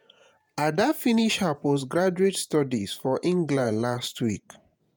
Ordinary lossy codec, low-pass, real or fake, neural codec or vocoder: none; none; fake; vocoder, 48 kHz, 128 mel bands, Vocos